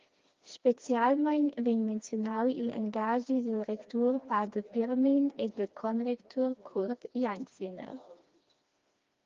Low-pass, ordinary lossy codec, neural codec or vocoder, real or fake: 7.2 kHz; Opus, 32 kbps; codec, 16 kHz, 2 kbps, FreqCodec, smaller model; fake